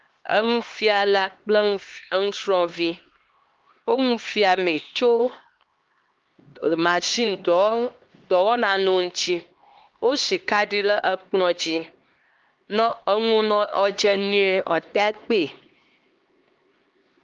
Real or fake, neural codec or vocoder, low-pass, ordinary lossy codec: fake; codec, 16 kHz, 2 kbps, X-Codec, HuBERT features, trained on LibriSpeech; 7.2 kHz; Opus, 32 kbps